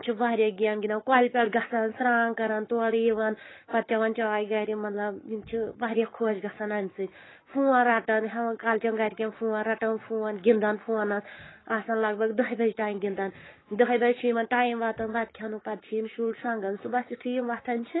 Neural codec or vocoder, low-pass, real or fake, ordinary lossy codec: codec, 44.1 kHz, 7.8 kbps, Pupu-Codec; 7.2 kHz; fake; AAC, 16 kbps